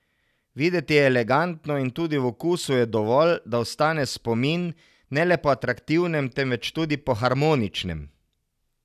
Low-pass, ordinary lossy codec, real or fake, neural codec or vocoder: 14.4 kHz; none; real; none